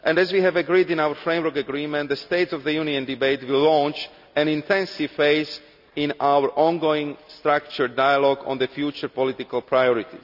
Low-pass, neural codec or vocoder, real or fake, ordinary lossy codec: 5.4 kHz; none; real; none